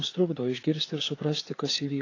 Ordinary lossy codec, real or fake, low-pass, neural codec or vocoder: AAC, 32 kbps; fake; 7.2 kHz; codec, 16 kHz, 4 kbps, FreqCodec, larger model